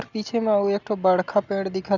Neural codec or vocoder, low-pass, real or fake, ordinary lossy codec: none; 7.2 kHz; real; none